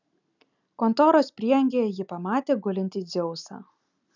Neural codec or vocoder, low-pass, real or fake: none; 7.2 kHz; real